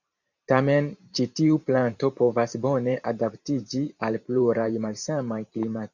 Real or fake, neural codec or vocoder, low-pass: fake; vocoder, 44.1 kHz, 128 mel bands every 512 samples, BigVGAN v2; 7.2 kHz